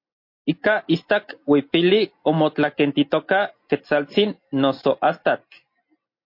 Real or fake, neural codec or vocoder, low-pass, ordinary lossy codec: real; none; 5.4 kHz; MP3, 32 kbps